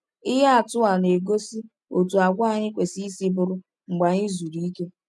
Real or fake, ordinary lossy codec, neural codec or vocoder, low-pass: real; none; none; none